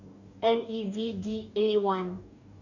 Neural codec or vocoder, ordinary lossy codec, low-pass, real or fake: codec, 44.1 kHz, 2.6 kbps, DAC; none; 7.2 kHz; fake